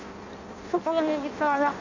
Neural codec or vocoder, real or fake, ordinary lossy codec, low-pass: codec, 16 kHz in and 24 kHz out, 0.6 kbps, FireRedTTS-2 codec; fake; none; 7.2 kHz